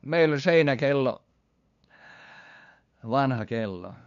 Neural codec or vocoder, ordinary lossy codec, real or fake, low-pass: codec, 16 kHz, 2 kbps, FunCodec, trained on LibriTTS, 25 frames a second; none; fake; 7.2 kHz